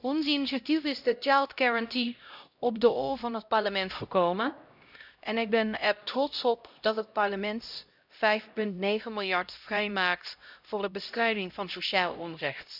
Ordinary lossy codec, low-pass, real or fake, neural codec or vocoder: none; 5.4 kHz; fake; codec, 16 kHz, 0.5 kbps, X-Codec, HuBERT features, trained on LibriSpeech